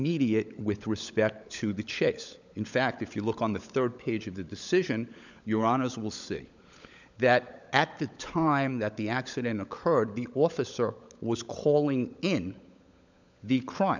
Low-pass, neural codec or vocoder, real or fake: 7.2 kHz; codec, 16 kHz, 16 kbps, FunCodec, trained on LibriTTS, 50 frames a second; fake